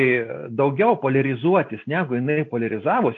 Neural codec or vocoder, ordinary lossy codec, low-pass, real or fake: none; AAC, 48 kbps; 7.2 kHz; real